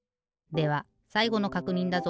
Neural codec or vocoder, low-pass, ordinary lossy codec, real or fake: none; none; none; real